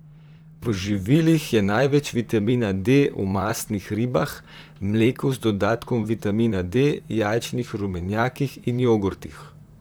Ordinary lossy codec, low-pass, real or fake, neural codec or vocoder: none; none; fake; vocoder, 44.1 kHz, 128 mel bands, Pupu-Vocoder